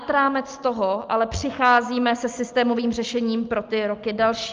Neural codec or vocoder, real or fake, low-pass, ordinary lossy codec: none; real; 7.2 kHz; Opus, 24 kbps